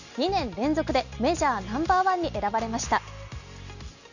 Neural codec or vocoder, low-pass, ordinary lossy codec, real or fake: none; 7.2 kHz; none; real